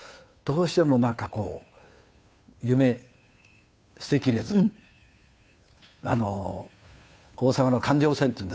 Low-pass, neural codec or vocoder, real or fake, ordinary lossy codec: none; codec, 16 kHz, 2 kbps, FunCodec, trained on Chinese and English, 25 frames a second; fake; none